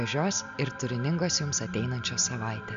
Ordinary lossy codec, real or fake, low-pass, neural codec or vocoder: MP3, 64 kbps; real; 7.2 kHz; none